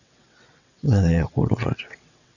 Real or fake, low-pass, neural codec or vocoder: fake; 7.2 kHz; vocoder, 22.05 kHz, 80 mel bands, WaveNeXt